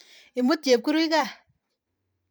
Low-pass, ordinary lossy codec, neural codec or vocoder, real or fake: none; none; vocoder, 44.1 kHz, 128 mel bands every 512 samples, BigVGAN v2; fake